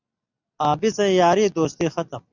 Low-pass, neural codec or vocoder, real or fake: 7.2 kHz; none; real